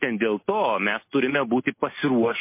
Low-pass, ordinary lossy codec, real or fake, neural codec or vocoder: 3.6 kHz; MP3, 24 kbps; real; none